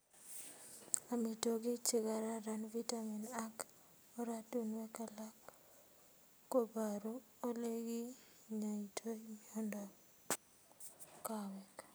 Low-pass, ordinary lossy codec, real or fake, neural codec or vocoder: none; none; real; none